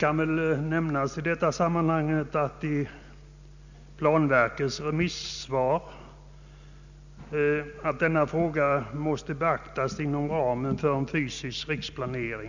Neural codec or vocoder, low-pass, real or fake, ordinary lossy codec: none; 7.2 kHz; real; none